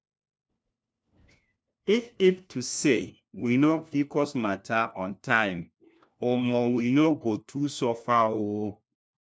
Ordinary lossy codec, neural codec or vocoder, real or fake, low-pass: none; codec, 16 kHz, 1 kbps, FunCodec, trained on LibriTTS, 50 frames a second; fake; none